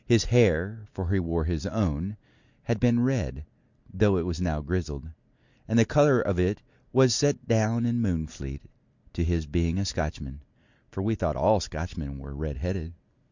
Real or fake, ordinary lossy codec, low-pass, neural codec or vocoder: real; Opus, 64 kbps; 7.2 kHz; none